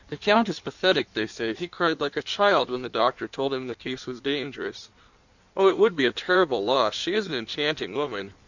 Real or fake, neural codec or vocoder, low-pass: fake; codec, 16 kHz in and 24 kHz out, 1.1 kbps, FireRedTTS-2 codec; 7.2 kHz